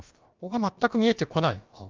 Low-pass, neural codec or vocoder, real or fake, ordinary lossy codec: 7.2 kHz; codec, 16 kHz, about 1 kbps, DyCAST, with the encoder's durations; fake; Opus, 24 kbps